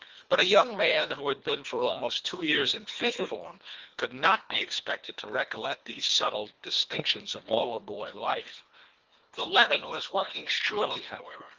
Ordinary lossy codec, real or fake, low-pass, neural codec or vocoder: Opus, 32 kbps; fake; 7.2 kHz; codec, 24 kHz, 1.5 kbps, HILCodec